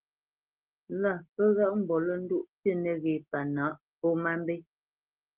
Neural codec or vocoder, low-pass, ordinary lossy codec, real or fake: none; 3.6 kHz; Opus, 16 kbps; real